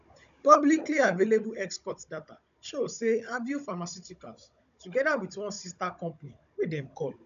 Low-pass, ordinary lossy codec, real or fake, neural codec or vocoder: 7.2 kHz; none; fake; codec, 16 kHz, 16 kbps, FunCodec, trained on Chinese and English, 50 frames a second